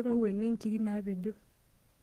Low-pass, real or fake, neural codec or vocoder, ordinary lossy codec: 14.4 kHz; fake; codec, 32 kHz, 1.9 kbps, SNAC; Opus, 24 kbps